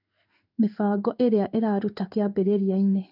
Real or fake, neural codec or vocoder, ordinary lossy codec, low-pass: fake; codec, 16 kHz in and 24 kHz out, 1 kbps, XY-Tokenizer; none; 5.4 kHz